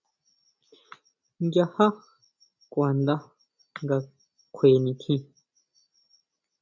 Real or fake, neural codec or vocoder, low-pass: real; none; 7.2 kHz